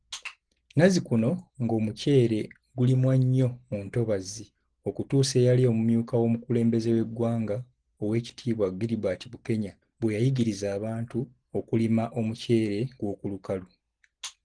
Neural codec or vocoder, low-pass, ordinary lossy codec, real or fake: none; 9.9 kHz; Opus, 16 kbps; real